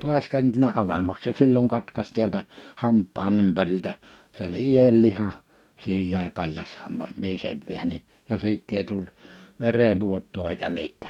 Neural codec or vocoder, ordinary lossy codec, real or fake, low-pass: codec, 44.1 kHz, 2.6 kbps, DAC; none; fake; 19.8 kHz